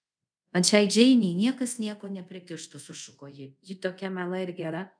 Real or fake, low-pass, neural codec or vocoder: fake; 9.9 kHz; codec, 24 kHz, 0.5 kbps, DualCodec